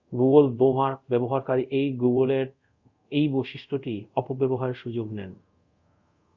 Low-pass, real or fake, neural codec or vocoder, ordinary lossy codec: 7.2 kHz; fake; codec, 24 kHz, 0.5 kbps, DualCodec; Opus, 64 kbps